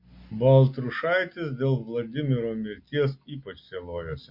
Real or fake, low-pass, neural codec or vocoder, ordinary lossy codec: real; 5.4 kHz; none; MP3, 32 kbps